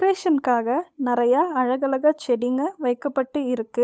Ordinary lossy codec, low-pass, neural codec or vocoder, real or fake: none; none; codec, 16 kHz, 8 kbps, FunCodec, trained on Chinese and English, 25 frames a second; fake